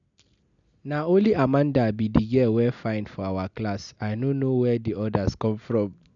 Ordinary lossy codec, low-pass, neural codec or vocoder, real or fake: none; 7.2 kHz; none; real